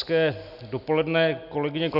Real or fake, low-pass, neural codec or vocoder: real; 5.4 kHz; none